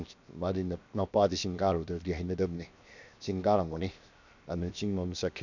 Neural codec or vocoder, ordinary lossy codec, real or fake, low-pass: codec, 16 kHz, 0.7 kbps, FocalCodec; none; fake; 7.2 kHz